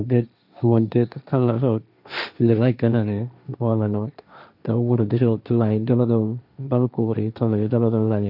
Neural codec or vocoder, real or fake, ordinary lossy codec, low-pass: codec, 16 kHz, 1.1 kbps, Voila-Tokenizer; fake; none; 5.4 kHz